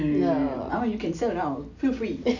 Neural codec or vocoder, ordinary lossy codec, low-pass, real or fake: none; none; 7.2 kHz; real